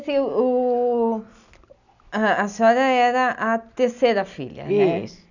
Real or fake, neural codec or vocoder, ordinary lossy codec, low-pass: real; none; none; 7.2 kHz